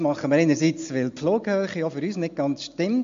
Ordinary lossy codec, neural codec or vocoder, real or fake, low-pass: MP3, 48 kbps; none; real; 7.2 kHz